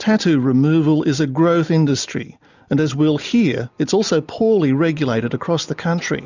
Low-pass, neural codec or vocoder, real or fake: 7.2 kHz; none; real